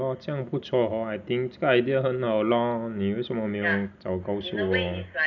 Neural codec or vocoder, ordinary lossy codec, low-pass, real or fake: none; none; 7.2 kHz; real